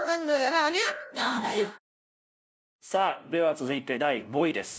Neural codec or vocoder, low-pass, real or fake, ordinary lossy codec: codec, 16 kHz, 0.5 kbps, FunCodec, trained on LibriTTS, 25 frames a second; none; fake; none